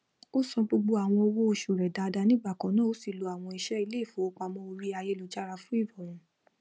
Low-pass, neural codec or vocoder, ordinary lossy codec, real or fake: none; none; none; real